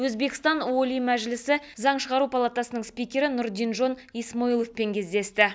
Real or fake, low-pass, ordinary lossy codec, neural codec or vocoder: real; none; none; none